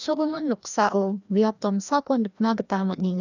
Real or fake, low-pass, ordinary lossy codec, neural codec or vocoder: fake; 7.2 kHz; none; codec, 16 kHz, 1 kbps, FreqCodec, larger model